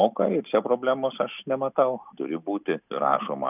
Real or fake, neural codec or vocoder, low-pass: real; none; 3.6 kHz